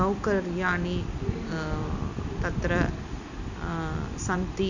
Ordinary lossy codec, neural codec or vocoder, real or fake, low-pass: none; none; real; 7.2 kHz